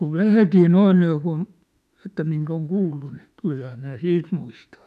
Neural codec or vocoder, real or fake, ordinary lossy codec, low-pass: autoencoder, 48 kHz, 32 numbers a frame, DAC-VAE, trained on Japanese speech; fake; none; 14.4 kHz